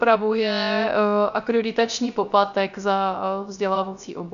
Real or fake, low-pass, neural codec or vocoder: fake; 7.2 kHz; codec, 16 kHz, 0.3 kbps, FocalCodec